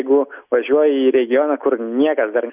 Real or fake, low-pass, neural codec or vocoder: real; 3.6 kHz; none